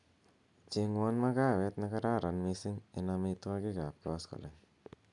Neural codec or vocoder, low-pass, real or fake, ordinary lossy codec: none; 10.8 kHz; real; none